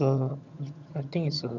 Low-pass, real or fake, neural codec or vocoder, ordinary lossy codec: 7.2 kHz; fake; vocoder, 22.05 kHz, 80 mel bands, HiFi-GAN; none